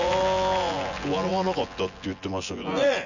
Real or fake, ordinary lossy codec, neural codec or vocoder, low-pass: fake; none; vocoder, 24 kHz, 100 mel bands, Vocos; 7.2 kHz